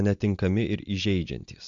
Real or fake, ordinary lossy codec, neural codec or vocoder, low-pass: real; AAC, 64 kbps; none; 7.2 kHz